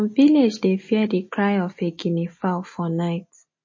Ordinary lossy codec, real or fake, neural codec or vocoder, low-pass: MP3, 32 kbps; real; none; 7.2 kHz